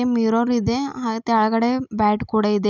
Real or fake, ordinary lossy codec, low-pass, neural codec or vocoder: real; none; 7.2 kHz; none